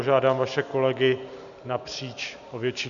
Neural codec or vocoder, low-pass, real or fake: none; 7.2 kHz; real